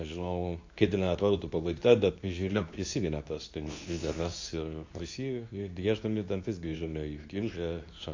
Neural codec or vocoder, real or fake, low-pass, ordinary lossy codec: codec, 24 kHz, 0.9 kbps, WavTokenizer, medium speech release version 2; fake; 7.2 kHz; AAC, 48 kbps